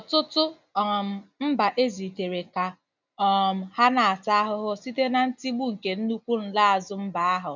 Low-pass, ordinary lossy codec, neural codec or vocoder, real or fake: 7.2 kHz; none; none; real